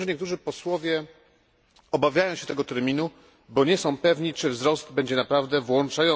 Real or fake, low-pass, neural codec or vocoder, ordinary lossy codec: real; none; none; none